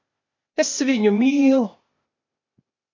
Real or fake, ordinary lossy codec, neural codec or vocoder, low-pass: fake; AAC, 48 kbps; codec, 16 kHz, 0.8 kbps, ZipCodec; 7.2 kHz